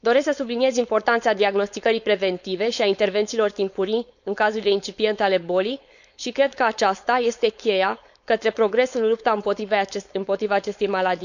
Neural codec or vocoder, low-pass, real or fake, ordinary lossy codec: codec, 16 kHz, 4.8 kbps, FACodec; 7.2 kHz; fake; none